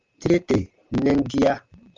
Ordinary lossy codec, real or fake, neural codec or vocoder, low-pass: Opus, 24 kbps; real; none; 7.2 kHz